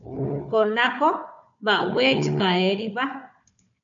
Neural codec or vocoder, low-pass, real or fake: codec, 16 kHz, 4 kbps, FunCodec, trained on Chinese and English, 50 frames a second; 7.2 kHz; fake